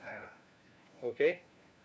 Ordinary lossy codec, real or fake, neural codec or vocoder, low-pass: none; fake; codec, 16 kHz, 1 kbps, FunCodec, trained on LibriTTS, 50 frames a second; none